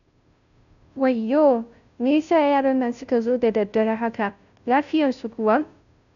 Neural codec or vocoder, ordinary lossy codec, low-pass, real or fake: codec, 16 kHz, 0.5 kbps, FunCodec, trained on Chinese and English, 25 frames a second; none; 7.2 kHz; fake